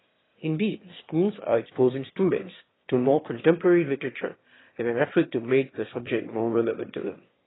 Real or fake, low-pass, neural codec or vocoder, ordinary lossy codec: fake; 7.2 kHz; autoencoder, 22.05 kHz, a latent of 192 numbers a frame, VITS, trained on one speaker; AAC, 16 kbps